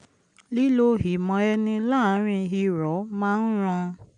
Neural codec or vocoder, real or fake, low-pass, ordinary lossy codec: none; real; 9.9 kHz; none